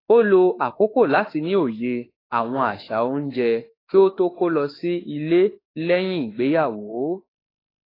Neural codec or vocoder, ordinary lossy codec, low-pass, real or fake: autoencoder, 48 kHz, 32 numbers a frame, DAC-VAE, trained on Japanese speech; AAC, 24 kbps; 5.4 kHz; fake